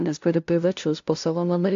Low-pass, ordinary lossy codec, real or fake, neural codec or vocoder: 7.2 kHz; AAC, 48 kbps; fake; codec, 16 kHz, 0.5 kbps, FunCodec, trained on LibriTTS, 25 frames a second